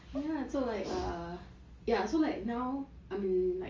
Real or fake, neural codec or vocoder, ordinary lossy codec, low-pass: real; none; Opus, 32 kbps; 7.2 kHz